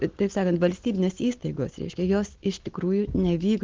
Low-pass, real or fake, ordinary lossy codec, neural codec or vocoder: 7.2 kHz; real; Opus, 16 kbps; none